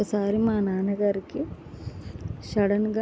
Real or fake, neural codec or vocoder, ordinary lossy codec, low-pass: real; none; none; none